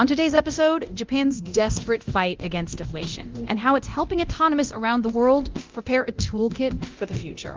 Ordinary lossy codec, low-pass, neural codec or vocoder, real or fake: Opus, 24 kbps; 7.2 kHz; codec, 24 kHz, 0.9 kbps, DualCodec; fake